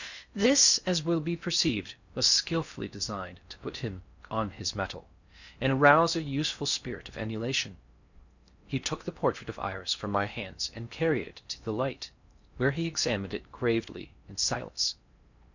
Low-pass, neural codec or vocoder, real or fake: 7.2 kHz; codec, 16 kHz in and 24 kHz out, 0.6 kbps, FocalCodec, streaming, 2048 codes; fake